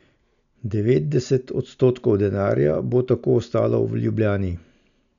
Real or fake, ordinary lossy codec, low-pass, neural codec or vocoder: real; none; 7.2 kHz; none